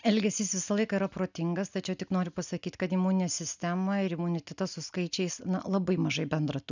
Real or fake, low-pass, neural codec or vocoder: real; 7.2 kHz; none